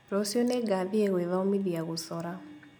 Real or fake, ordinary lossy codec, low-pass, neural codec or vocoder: real; none; none; none